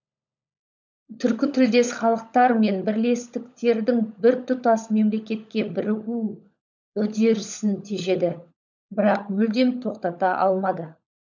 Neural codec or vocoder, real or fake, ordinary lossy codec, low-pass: codec, 16 kHz, 16 kbps, FunCodec, trained on LibriTTS, 50 frames a second; fake; none; 7.2 kHz